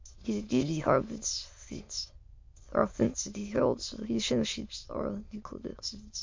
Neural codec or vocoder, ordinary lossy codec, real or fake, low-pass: autoencoder, 22.05 kHz, a latent of 192 numbers a frame, VITS, trained on many speakers; MP3, 48 kbps; fake; 7.2 kHz